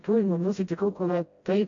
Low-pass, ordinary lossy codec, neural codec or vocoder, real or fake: 7.2 kHz; AAC, 48 kbps; codec, 16 kHz, 0.5 kbps, FreqCodec, smaller model; fake